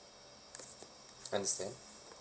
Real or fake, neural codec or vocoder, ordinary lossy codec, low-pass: real; none; none; none